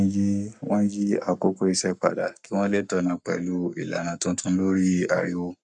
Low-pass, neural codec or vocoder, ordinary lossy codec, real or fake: 10.8 kHz; codec, 44.1 kHz, 7.8 kbps, Pupu-Codec; none; fake